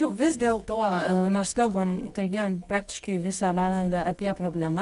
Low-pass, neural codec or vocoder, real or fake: 10.8 kHz; codec, 24 kHz, 0.9 kbps, WavTokenizer, medium music audio release; fake